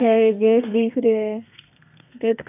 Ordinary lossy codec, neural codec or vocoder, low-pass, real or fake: none; codec, 32 kHz, 1.9 kbps, SNAC; 3.6 kHz; fake